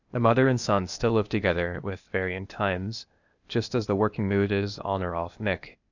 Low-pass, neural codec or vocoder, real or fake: 7.2 kHz; codec, 16 kHz, 0.8 kbps, ZipCodec; fake